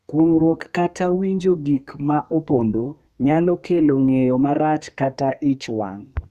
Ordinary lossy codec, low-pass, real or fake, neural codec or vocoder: Opus, 64 kbps; 14.4 kHz; fake; codec, 32 kHz, 1.9 kbps, SNAC